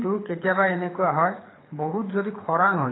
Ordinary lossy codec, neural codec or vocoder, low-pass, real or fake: AAC, 16 kbps; codec, 24 kHz, 6 kbps, HILCodec; 7.2 kHz; fake